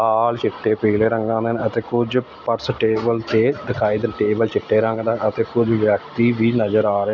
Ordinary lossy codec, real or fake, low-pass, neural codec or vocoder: none; real; 7.2 kHz; none